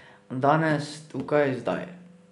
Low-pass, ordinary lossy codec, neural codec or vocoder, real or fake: 10.8 kHz; none; none; real